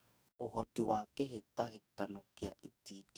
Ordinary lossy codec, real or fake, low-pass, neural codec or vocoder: none; fake; none; codec, 44.1 kHz, 2.6 kbps, DAC